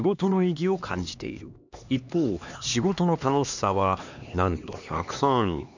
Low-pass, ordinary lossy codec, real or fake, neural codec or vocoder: 7.2 kHz; none; fake; codec, 16 kHz, 2 kbps, X-Codec, HuBERT features, trained on LibriSpeech